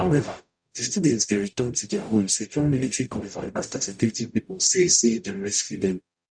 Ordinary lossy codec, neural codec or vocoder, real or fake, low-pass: none; codec, 44.1 kHz, 0.9 kbps, DAC; fake; 9.9 kHz